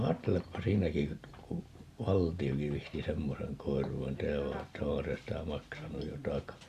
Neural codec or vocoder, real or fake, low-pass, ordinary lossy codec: none; real; 14.4 kHz; Opus, 64 kbps